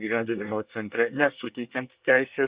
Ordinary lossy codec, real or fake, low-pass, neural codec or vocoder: Opus, 64 kbps; fake; 3.6 kHz; codec, 24 kHz, 1 kbps, SNAC